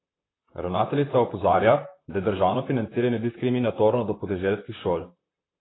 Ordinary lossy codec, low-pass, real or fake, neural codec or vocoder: AAC, 16 kbps; 7.2 kHz; fake; vocoder, 44.1 kHz, 128 mel bands, Pupu-Vocoder